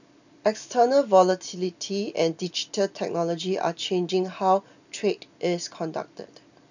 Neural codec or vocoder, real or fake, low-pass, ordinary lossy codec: none; real; 7.2 kHz; none